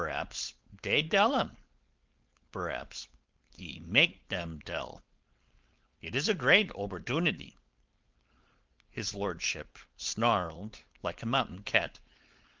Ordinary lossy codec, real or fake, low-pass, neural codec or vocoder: Opus, 32 kbps; fake; 7.2 kHz; codec, 16 kHz, 4.8 kbps, FACodec